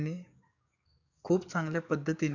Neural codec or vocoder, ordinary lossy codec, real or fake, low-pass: codec, 44.1 kHz, 7.8 kbps, DAC; none; fake; 7.2 kHz